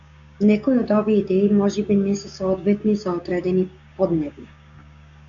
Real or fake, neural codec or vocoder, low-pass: fake; codec, 16 kHz, 6 kbps, DAC; 7.2 kHz